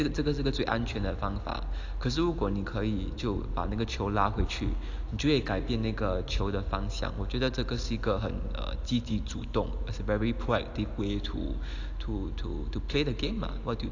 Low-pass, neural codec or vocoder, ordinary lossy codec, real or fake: 7.2 kHz; none; AAC, 48 kbps; real